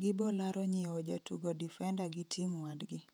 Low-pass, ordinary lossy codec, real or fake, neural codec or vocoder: 19.8 kHz; none; fake; vocoder, 48 kHz, 128 mel bands, Vocos